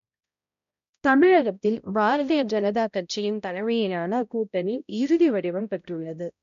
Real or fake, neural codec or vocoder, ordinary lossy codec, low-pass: fake; codec, 16 kHz, 0.5 kbps, X-Codec, HuBERT features, trained on balanced general audio; none; 7.2 kHz